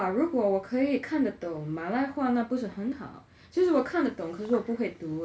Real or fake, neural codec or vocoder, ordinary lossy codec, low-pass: real; none; none; none